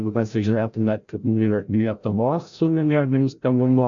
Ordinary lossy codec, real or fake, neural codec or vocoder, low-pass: AAC, 64 kbps; fake; codec, 16 kHz, 0.5 kbps, FreqCodec, larger model; 7.2 kHz